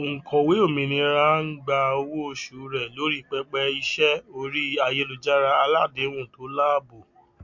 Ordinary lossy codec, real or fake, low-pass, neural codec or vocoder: MP3, 48 kbps; real; 7.2 kHz; none